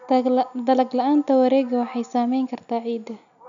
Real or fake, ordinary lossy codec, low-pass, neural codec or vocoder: real; none; 7.2 kHz; none